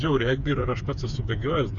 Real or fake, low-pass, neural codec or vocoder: fake; 7.2 kHz; codec, 16 kHz, 4 kbps, FreqCodec, smaller model